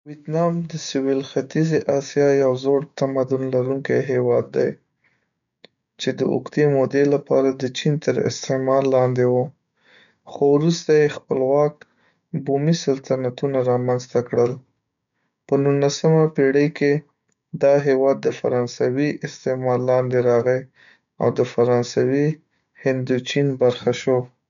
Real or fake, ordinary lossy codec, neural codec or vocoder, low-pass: fake; none; codec, 16 kHz, 6 kbps, DAC; 7.2 kHz